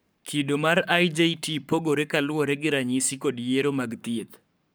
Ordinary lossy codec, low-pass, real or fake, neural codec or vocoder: none; none; fake; codec, 44.1 kHz, 7.8 kbps, Pupu-Codec